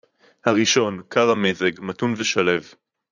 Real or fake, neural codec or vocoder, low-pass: fake; vocoder, 44.1 kHz, 80 mel bands, Vocos; 7.2 kHz